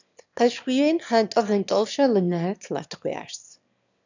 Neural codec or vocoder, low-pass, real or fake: autoencoder, 22.05 kHz, a latent of 192 numbers a frame, VITS, trained on one speaker; 7.2 kHz; fake